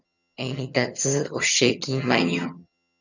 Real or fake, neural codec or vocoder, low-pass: fake; vocoder, 22.05 kHz, 80 mel bands, HiFi-GAN; 7.2 kHz